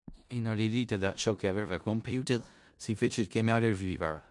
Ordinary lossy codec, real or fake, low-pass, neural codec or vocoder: MP3, 64 kbps; fake; 10.8 kHz; codec, 16 kHz in and 24 kHz out, 0.4 kbps, LongCat-Audio-Codec, four codebook decoder